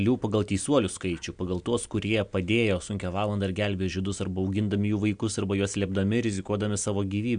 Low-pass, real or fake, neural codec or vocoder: 9.9 kHz; real; none